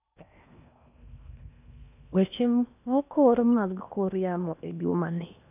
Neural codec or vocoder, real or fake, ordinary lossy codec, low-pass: codec, 16 kHz in and 24 kHz out, 0.8 kbps, FocalCodec, streaming, 65536 codes; fake; none; 3.6 kHz